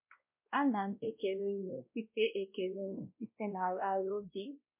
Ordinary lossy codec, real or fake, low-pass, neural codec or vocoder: MP3, 32 kbps; fake; 3.6 kHz; codec, 16 kHz, 1 kbps, X-Codec, WavLM features, trained on Multilingual LibriSpeech